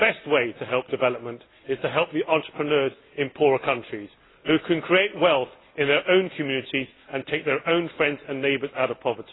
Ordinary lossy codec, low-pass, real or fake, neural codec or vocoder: AAC, 16 kbps; 7.2 kHz; real; none